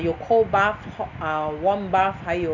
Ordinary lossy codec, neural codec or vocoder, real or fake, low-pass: none; none; real; 7.2 kHz